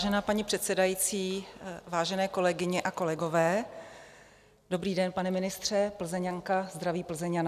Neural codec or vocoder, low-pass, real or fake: none; 14.4 kHz; real